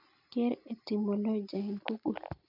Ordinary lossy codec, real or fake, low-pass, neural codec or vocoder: none; real; 5.4 kHz; none